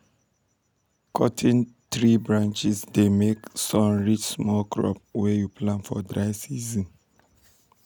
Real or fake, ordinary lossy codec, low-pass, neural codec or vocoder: real; none; none; none